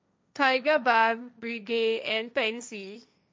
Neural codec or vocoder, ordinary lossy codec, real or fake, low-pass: codec, 16 kHz, 1.1 kbps, Voila-Tokenizer; none; fake; none